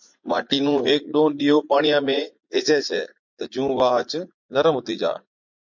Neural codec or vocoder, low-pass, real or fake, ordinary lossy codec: vocoder, 44.1 kHz, 80 mel bands, Vocos; 7.2 kHz; fake; MP3, 48 kbps